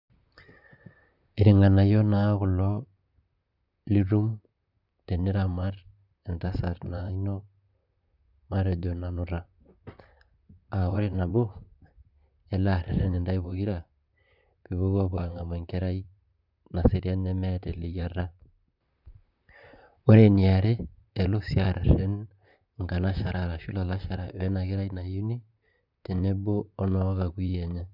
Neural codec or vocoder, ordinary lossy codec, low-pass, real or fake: vocoder, 44.1 kHz, 128 mel bands, Pupu-Vocoder; none; 5.4 kHz; fake